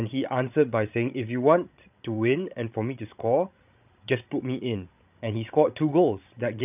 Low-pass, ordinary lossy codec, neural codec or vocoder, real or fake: 3.6 kHz; none; codec, 16 kHz, 16 kbps, FreqCodec, larger model; fake